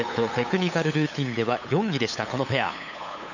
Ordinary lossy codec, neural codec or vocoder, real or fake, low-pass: none; codec, 16 kHz, 8 kbps, FunCodec, trained on LibriTTS, 25 frames a second; fake; 7.2 kHz